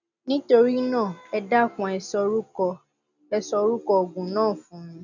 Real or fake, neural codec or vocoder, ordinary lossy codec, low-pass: real; none; none; 7.2 kHz